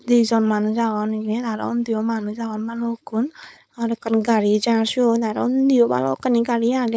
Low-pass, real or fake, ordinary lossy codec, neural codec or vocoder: none; fake; none; codec, 16 kHz, 4.8 kbps, FACodec